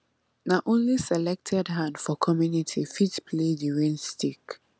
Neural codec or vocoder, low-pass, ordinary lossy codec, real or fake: none; none; none; real